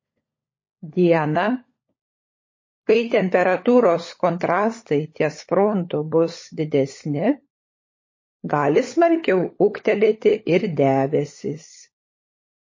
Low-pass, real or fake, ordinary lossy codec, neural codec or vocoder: 7.2 kHz; fake; MP3, 32 kbps; codec, 16 kHz, 16 kbps, FunCodec, trained on LibriTTS, 50 frames a second